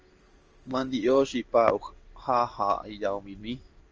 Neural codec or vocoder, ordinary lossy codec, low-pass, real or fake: codec, 16 kHz in and 24 kHz out, 1 kbps, XY-Tokenizer; Opus, 24 kbps; 7.2 kHz; fake